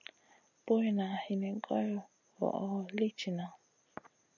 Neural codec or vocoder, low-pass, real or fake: none; 7.2 kHz; real